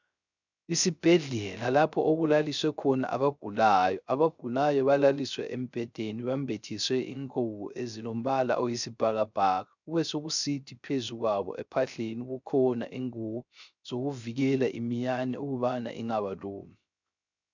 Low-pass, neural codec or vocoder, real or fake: 7.2 kHz; codec, 16 kHz, 0.3 kbps, FocalCodec; fake